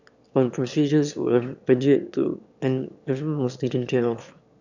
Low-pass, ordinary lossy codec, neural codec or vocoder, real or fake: 7.2 kHz; none; autoencoder, 22.05 kHz, a latent of 192 numbers a frame, VITS, trained on one speaker; fake